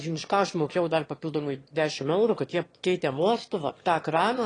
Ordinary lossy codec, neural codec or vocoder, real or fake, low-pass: AAC, 32 kbps; autoencoder, 22.05 kHz, a latent of 192 numbers a frame, VITS, trained on one speaker; fake; 9.9 kHz